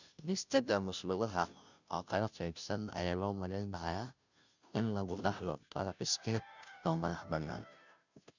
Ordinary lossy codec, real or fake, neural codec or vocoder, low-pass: none; fake; codec, 16 kHz, 0.5 kbps, FunCodec, trained on Chinese and English, 25 frames a second; 7.2 kHz